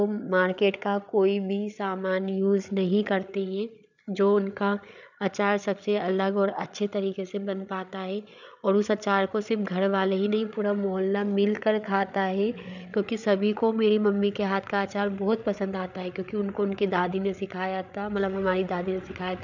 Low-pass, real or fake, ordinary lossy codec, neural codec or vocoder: 7.2 kHz; fake; none; codec, 16 kHz, 8 kbps, FreqCodec, larger model